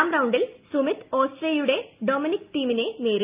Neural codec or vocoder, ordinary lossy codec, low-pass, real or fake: none; Opus, 24 kbps; 3.6 kHz; real